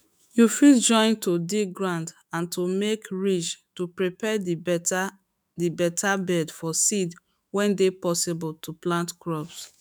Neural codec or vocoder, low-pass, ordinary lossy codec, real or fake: autoencoder, 48 kHz, 128 numbers a frame, DAC-VAE, trained on Japanese speech; none; none; fake